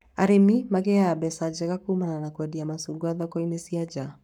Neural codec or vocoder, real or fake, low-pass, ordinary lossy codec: codec, 44.1 kHz, 7.8 kbps, Pupu-Codec; fake; 19.8 kHz; none